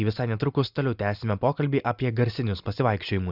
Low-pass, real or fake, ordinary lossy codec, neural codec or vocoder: 5.4 kHz; real; Opus, 64 kbps; none